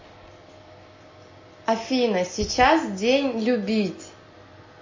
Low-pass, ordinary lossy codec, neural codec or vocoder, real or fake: 7.2 kHz; MP3, 32 kbps; none; real